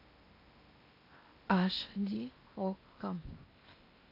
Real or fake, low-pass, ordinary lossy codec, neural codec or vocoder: fake; 5.4 kHz; AAC, 32 kbps; codec, 16 kHz in and 24 kHz out, 0.8 kbps, FocalCodec, streaming, 65536 codes